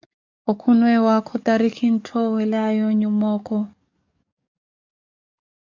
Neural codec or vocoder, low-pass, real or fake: codec, 44.1 kHz, 7.8 kbps, DAC; 7.2 kHz; fake